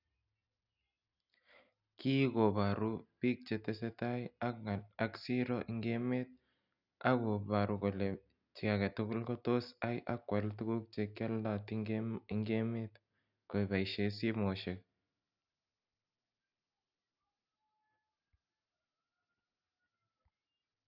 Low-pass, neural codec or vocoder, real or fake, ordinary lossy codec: 5.4 kHz; none; real; none